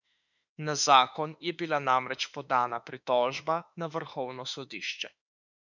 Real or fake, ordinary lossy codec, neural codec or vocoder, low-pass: fake; none; autoencoder, 48 kHz, 32 numbers a frame, DAC-VAE, trained on Japanese speech; 7.2 kHz